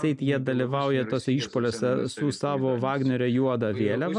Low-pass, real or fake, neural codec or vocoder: 10.8 kHz; real; none